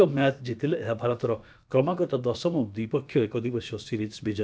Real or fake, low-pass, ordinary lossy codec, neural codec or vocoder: fake; none; none; codec, 16 kHz, about 1 kbps, DyCAST, with the encoder's durations